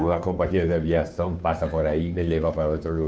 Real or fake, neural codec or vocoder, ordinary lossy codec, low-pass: fake; codec, 16 kHz, 2 kbps, FunCodec, trained on Chinese and English, 25 frames a second; none; none